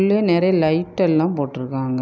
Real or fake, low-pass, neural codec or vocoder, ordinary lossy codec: real; none; none; none